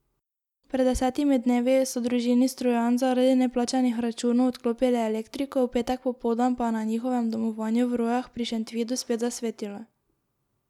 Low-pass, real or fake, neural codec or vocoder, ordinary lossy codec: 19.8 kHz; real; none; none